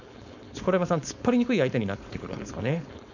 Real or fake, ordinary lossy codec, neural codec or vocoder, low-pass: fake; none; codec, 16 kHz, 4.8 kbps, FACodec; 7.2 kHz